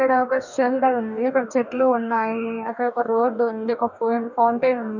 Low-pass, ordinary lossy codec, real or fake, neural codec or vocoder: 7.2 kHz; none; fake; codec, 44.1 kHz, 2.6 kbps, DAC